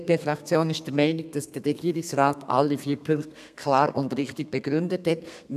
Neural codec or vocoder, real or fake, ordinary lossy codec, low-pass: codec, 32 kHz, 1.9 kbps, SNAC; fake; none; 14.4 kHz